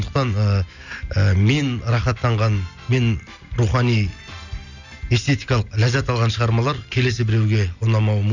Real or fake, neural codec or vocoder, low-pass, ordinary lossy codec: real; none; 7.2 kHz; none